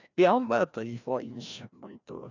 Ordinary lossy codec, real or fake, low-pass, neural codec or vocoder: none; fake; 7.2 kHz; codec, 16 kHz, 1 kbps, FreqCodec, larger model